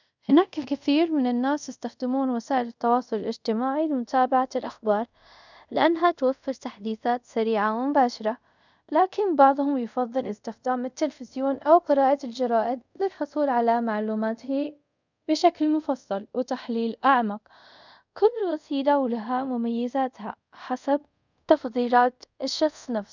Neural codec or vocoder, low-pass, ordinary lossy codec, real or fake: codec, 24 kHz, 0.5 kbps, DualCodec; 7.2 kHz; none; fake